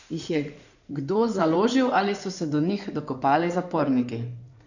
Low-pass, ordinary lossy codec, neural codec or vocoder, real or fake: 7.2 kHz; none; codec, 16 kHz in and 24 kHz out, 2.2 kbps, FireRedTTS-2 codec; fake